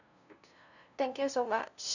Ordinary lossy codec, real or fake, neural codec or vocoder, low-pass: none; fake; codec, 16 kHz, 0.5 kbps, FunCodec, trained on LibriTTS, 25 frames a second; 7.2 kHz